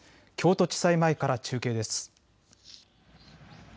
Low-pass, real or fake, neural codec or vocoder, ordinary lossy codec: none; real; none; none